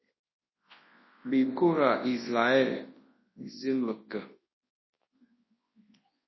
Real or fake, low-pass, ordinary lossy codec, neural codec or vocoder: fake; 7.2 kHz; MP3, 24 kbps; codec, 24 kHz, 0.9 kbps, WavTokenizer, large speech release